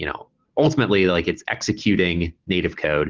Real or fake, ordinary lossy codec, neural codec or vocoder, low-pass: real; Opus, 16 kbps; none; 7.2 kHz